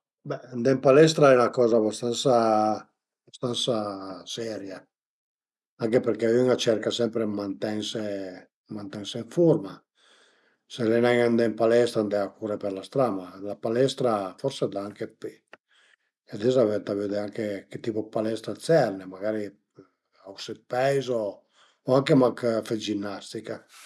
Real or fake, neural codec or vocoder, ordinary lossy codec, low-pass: real; none; none; none